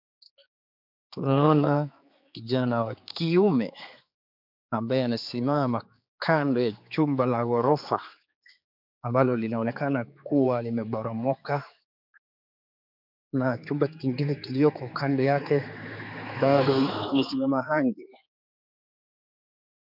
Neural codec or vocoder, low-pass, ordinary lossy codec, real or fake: codec, 16 kHz, 4 kbps, X-Codec, HuBERT features, trained on general audio; 5.4 kHz; MP3, 48 kbps; fake